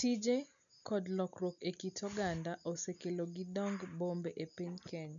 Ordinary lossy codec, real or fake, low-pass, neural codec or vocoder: none; real; 7.2 kHz; none